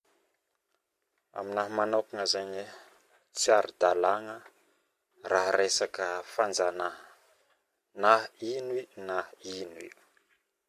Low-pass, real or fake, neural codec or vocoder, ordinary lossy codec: 14.4 kHz; fake; vocoder, 44.1 kHz, 128 mel bands every 256 samples, BigVGAN v2; MP3, 64 kbps